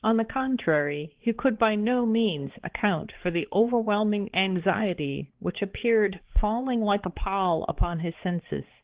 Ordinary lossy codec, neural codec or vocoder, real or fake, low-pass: Opus, 16 kbps; codec, 16 kHz, 4 kbps, X-Codec, HuBERT features, trained on balanced general audio; fake; 3.6 kHz